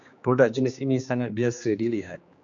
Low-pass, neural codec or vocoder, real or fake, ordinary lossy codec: 7.2 kHz; codec, 16 kHz, 2 kbps, X-Codec, HuBERT features, trained on general audio; fake; AAC, 64 kbps